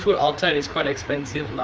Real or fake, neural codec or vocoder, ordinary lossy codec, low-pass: fake; codec, 16 kHz, 4 kbps, FreqCodec, larger model; none; none